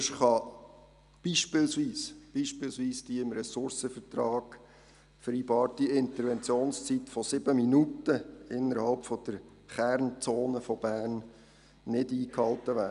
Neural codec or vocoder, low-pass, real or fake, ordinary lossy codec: none; 10.8 kHz; real; none